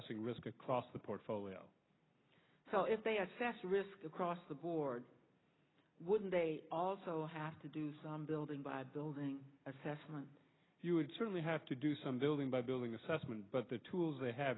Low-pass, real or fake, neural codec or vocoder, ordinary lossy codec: 7.2 kHz; real; none; AAC, 16 kbps